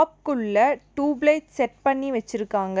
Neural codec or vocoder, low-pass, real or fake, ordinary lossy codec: none; none; real; none